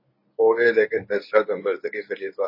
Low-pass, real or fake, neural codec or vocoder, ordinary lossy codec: 5.4 kHz; fake; codec, 24 kHz, 0.9 kbps, WavTokenizer, medium speech release version 1; MP3, 24 kbps